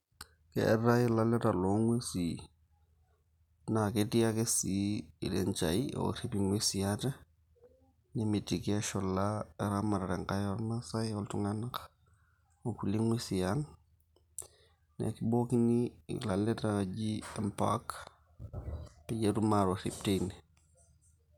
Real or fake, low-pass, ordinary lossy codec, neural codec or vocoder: real; 19.8 kHz; none; none